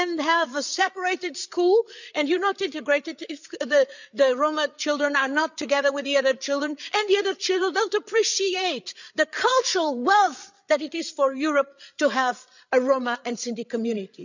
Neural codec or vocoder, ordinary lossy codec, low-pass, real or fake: codec, 16 kHz, 8 kbps, FreqCodec, larger model; none; 7.2 kHz; fake